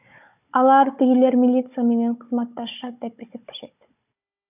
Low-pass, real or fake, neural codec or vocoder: 3.6 kHz; fake; codec, 16 kHz, 16 kbps, FunCodec, trained on Chinese and English, 50 frames a second